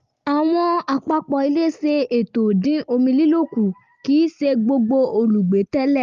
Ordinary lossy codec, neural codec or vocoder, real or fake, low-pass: Opus, 32 kbps; none; real; 7.2 kHz